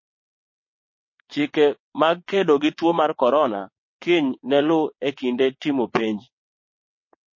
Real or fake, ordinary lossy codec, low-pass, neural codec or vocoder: fake; MP3, 32 kbps; 7.2 kHz; vocoder, 22.05 kHz, 80 mel bands, WaveNeXt